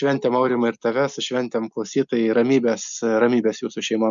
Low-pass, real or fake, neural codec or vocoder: 7.2 kHz; real; none